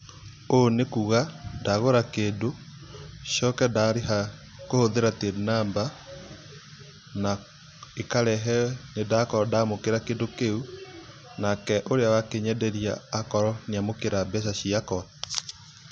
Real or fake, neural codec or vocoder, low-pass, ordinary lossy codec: real; none; 9.9 kHz; none